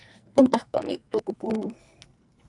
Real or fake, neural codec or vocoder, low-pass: fake; codec, 44.1 kHz, 2.6 kbps, SNAC; 10.8 kHz